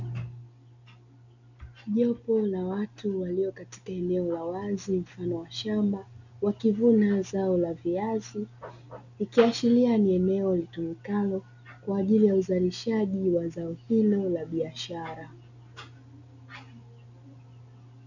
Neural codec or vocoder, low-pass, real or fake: none; 7.2 kHz; real